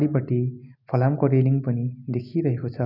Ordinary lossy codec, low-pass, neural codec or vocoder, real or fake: none; 5.4 kHz; none; real